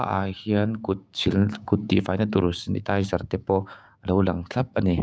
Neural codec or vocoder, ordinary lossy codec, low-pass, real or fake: codec, 16 kHz, 6 kbps, DAC; none; none; fake